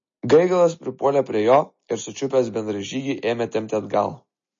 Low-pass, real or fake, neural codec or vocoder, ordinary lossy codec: 7.2 kHz; real; none; MP3, 32 kbps